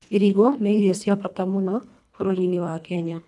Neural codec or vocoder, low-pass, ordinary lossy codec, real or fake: codec, 24 kHz, 1.5 kbps, HILCodec; none; none; fake